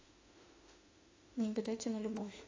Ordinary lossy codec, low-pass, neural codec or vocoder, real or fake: AAC, 32 kbps; 7.2 kHz; autoencoder, 48 kHz, 32 numbers a frame, DAC-VAE, trained on Japanese speech; fake